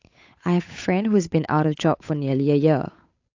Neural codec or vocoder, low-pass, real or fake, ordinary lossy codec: codec, 16 kHz, 8 kbps, FunCodec, trained on Chinese and English, 25 frames a second; 7.2 kHz; fake; AAC, 48 kbps